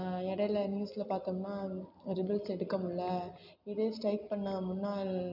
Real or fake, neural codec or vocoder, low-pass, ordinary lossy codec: real; none; 5.4 kHz; none